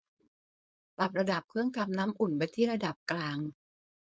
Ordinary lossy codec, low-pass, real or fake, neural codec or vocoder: none; none; fake; codec, 16 kHz, 4.8 kbps, FACodec